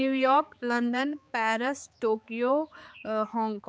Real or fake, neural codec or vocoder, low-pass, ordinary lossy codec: fake; codec, 16 kHz, 4 kbps, X-Codec, HuBERT features, trained on balanced general audio; none; none